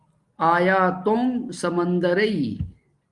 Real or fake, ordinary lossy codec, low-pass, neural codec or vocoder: real; Opus, 24 kbps; 10.8 kHz; none